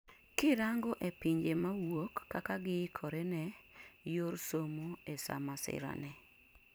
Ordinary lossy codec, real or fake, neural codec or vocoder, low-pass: none; fake; vocoder, 44.1 kHz, 128 mel bands every 256 samples, BigVGAN v2; none